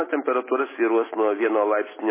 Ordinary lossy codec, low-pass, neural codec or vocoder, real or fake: MP3, 16 kbps; 3.6 kHz; none; real